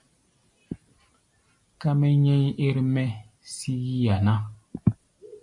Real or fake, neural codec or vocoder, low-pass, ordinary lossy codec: real; none; 10.8 kHz; MP3, 64 kbps